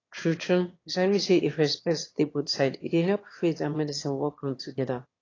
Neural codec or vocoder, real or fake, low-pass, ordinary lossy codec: autoencoder, 22.05 kHz, a latent of 192 numbers a frame, VITS, trained on one speaker; fake; 7.2 kHz; AAC, 32 kbps